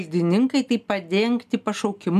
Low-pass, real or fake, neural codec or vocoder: 14.4 kHz; real; none